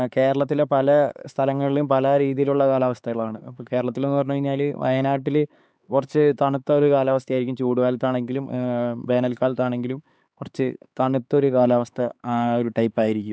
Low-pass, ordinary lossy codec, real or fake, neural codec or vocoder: none; none; fake; codec, 16 kHz, 4 kbps, X-Codec, HuBERT features, trained on LibriSpeech